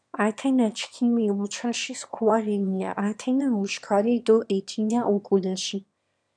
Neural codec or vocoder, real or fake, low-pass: autoencoder, 22.05 kHz, a latent of 192 numbers a frame, VITS, trained on one speaker; fake; 9.9 kHz